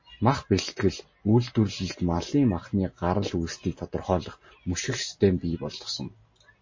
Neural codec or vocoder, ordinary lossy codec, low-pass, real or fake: none; MP3, 32 kbps; 7.2 kHz; real